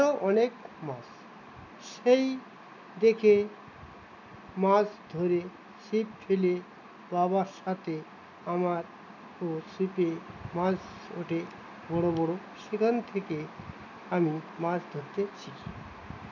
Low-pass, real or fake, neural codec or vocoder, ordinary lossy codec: 7.2 kHz; real; none; none